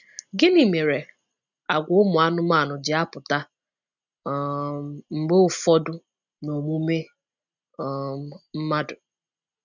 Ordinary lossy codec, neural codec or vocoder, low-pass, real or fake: none; none; 7.2 kHz; real